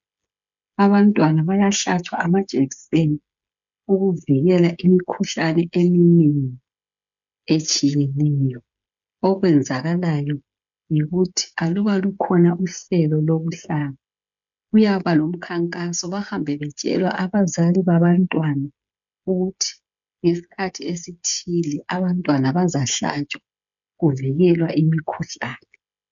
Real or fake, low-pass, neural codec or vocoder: fake; 7.2 kHz; codec, 16 kHz, 16 kbps, FreqCodec, smaller model